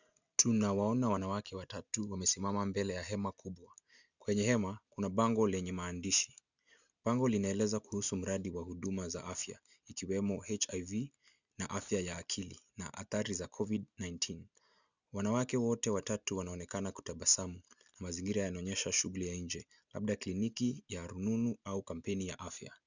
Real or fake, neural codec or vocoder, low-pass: real; none; 7.2 kHz